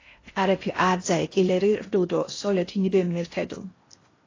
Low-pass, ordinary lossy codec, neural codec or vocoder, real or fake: 7.2 kHz; AAC, 32 kbps; codec, 16 kHz in and 24 kHz out, 0.6 kbps, FocalCodec, streaming, 4096 codes; fake